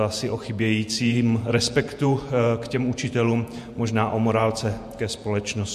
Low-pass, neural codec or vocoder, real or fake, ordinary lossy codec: 14.4 kHz; vocoder, 48 kHz, 128 mel bands, Vocos; fake; MP3, 64 kbps